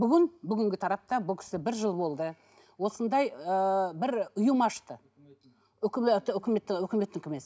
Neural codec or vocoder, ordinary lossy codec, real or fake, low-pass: none; none; real; none